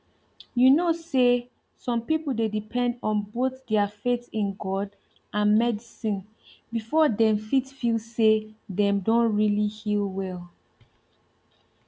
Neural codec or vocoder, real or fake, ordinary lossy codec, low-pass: none; real; none; none